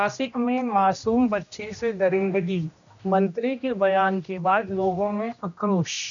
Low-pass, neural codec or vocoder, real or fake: 7.2 kHz; codec, 16 kHz, 1 kbps, X-Codec, HuBERT features, trained on general audio; fake